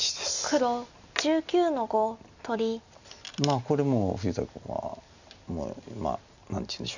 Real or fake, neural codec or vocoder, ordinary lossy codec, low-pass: real; none; none; 7.2 kHz